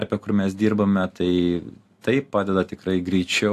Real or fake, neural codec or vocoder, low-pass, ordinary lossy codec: real; none; 14.4 kHz; AAC, 64 kbps